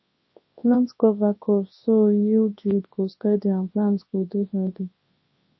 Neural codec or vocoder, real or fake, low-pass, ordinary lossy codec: codec, 24 kHz, 0.9 kbps, WavTokenizer, large speech release; fake; 7.2 kHz; MP3, 24 kbps